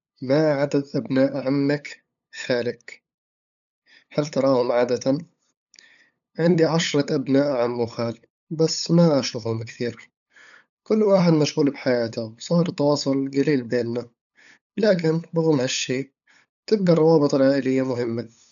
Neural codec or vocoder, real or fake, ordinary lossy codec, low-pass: codec, 16 kHz, 8 kbps, FunCodec, trained on LibriTTS, 25 frames a second; fake; none; 7.2 kHz